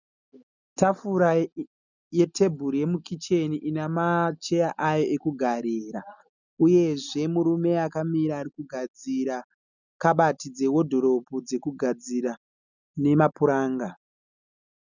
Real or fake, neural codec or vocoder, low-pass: real; none; 7.2 kHz